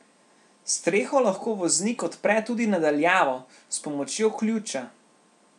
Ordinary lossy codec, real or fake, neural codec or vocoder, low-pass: none; real; none; 10.8 kHz